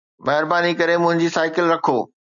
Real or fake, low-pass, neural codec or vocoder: real; 7.2 kHz; none